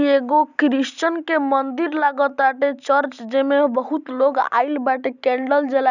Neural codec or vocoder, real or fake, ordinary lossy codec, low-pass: none; real; none; 7.2 kHz